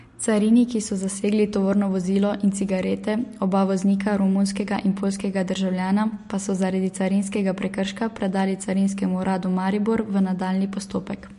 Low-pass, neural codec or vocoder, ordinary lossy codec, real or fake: 14.4 kHz; none; MP3, 48 kbps; real